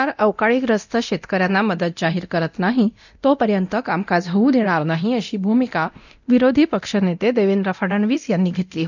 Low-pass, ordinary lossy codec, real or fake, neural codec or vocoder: 7.2 kHz; none; fake; codec, 24 kHz, 0.9 kbps, DualCodec